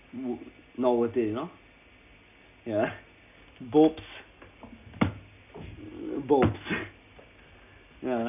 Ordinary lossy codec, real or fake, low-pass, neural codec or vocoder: none; real; 3.6 kHz; none